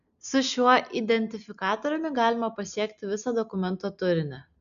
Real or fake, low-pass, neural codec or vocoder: real; 7.2 kHz; none